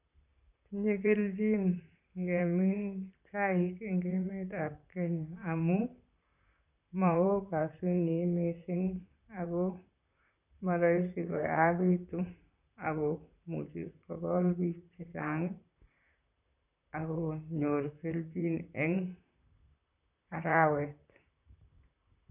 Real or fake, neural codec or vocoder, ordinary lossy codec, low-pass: fake; vocoder, 44.1 kHz, 128 mel bands, Pupu-Vocoder; none; 3.6 kHz